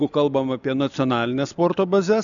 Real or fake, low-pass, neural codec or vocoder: real; 7.2 kHz; none